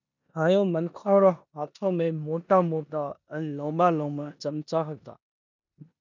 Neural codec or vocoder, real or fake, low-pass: codec, 16 kHz in and 24 kHz out, 0.9 kbps, LongCat-Audio-Codec, four codebook decoder; fake; 7.2 kHz